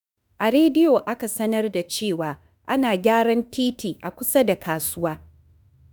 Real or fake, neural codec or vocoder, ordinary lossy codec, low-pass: fake; autoencoder, 48 kHz, 32 numbers a frame, DAC-VAE, trained on Japanese speech; none; none